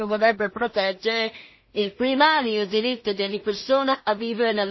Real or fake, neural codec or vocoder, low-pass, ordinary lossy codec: fake; codec, 16 kHz in and 24 kHz out, 0.4 kbps, LongCat-Audio-Codec, two codebook decoder; 7.2 kHz; MP3, 24 kbps